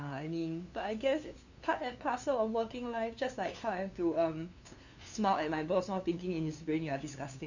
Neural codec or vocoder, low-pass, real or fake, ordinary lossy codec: codec, 16 kHz, 2 kbps, FunCodec, trained on LibriTTS, 25 frames a second; 7.2 kHz; fake; none